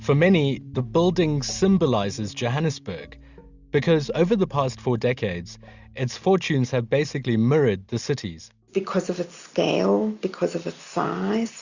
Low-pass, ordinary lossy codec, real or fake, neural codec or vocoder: 7.2 kHz; Opus, 64 kbps; real; none